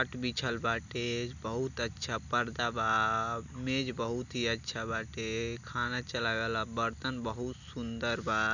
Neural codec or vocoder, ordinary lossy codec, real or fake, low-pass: none; none; real; 7.2 kHz